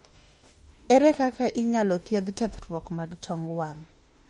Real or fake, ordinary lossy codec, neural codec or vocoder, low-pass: fake; MP3, 48 kbps; autoencoder, 48 kHz, 32 numbers a frame, DAC-VAE, trained on Japanese speech; 19.8 kHz